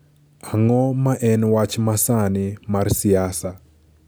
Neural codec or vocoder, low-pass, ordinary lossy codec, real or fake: none; none; none; real